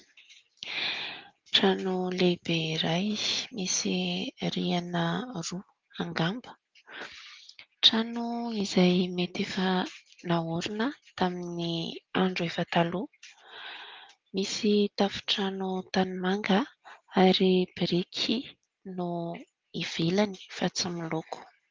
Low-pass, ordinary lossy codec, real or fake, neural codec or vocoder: 7.2 kHz; Opus, 32 kbps; real; none